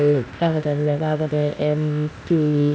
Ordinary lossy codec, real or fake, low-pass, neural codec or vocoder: none; fake; none; codec, 16 kHz, 0.8 kbps, ZipCodec